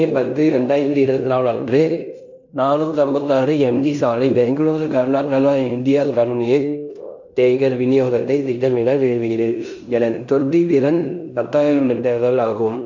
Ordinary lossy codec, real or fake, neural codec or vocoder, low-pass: none; fake; codec, 16 kHz in and 24 kHz out, 0.9 kbps, LongCat-Audio-Codec, fine tuned four codebook decoder; 7.2 kHz